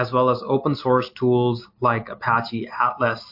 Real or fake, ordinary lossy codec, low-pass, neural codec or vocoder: real; MP3, 32 kbps; 5.4 kHz; none